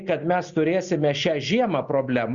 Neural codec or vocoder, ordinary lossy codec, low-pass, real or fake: none; Opus, 64 kbps; 7.2 kHz; real